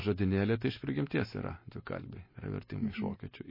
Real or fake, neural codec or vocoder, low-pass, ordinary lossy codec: real; none; 5.4 kHz; MP3, 24 kbps